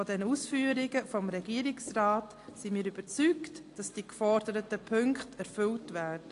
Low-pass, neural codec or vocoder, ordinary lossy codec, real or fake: 10.8 kHz; none; AAC, 48 kbps; real